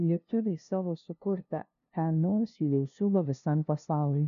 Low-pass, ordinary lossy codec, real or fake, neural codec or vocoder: 7.2 kHz; AAC, 96 kbps; fake; codec, 16 kHz, 0.5 kbps, FunCodec, trained on LibriTTS, 25 frames a second